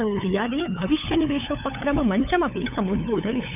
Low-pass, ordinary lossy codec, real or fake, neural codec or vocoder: 3.6 kHz; none; fake; codec, 16 kHz, 8 kbps, FunCodec, trained on LibriTTS, 25 frames a second